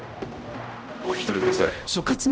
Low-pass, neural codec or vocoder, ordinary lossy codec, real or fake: none; codec, 16 kHz, 0.5 kbps, X-Codec, HuBERT features, trained on general audio; none; fake